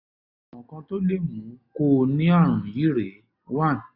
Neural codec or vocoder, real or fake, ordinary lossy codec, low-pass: none; real; Opus, 64 kbps; 5.4 kHz